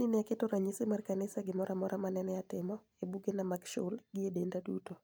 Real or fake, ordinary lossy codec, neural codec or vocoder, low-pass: real; none; none; none